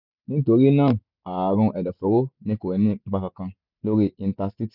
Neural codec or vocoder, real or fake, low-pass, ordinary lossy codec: none; real; 5.4 kHz; none